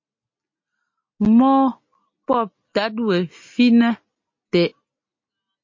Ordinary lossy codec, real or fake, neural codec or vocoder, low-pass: MP3, 32 kbps; real; none; 7.2 kHz